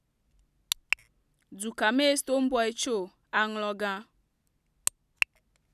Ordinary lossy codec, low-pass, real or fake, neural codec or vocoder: none; 14.4 kHz; real; none